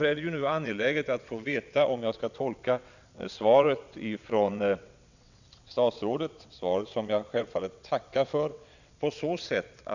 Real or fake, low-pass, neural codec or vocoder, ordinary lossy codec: fake; 7.2 kHz; vocoder, 22.05 kHz, 80 mel bands, WaveNeXt; none